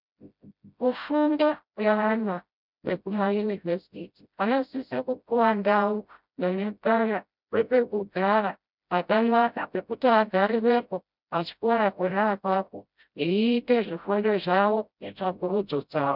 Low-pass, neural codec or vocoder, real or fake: 5.4 kHz; codec, 16 kHz, 0.5 kbps, FreqCodec, smaller model; fake